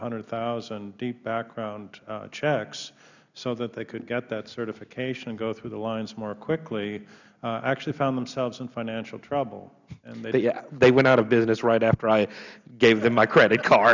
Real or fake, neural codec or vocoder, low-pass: real; none; 7.2 kHz